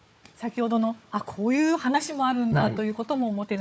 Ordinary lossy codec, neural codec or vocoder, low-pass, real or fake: none; codec, 16 kHz, 4 kbps, FunCodec, trained on Chinese and English, 50 frames a second; none; fake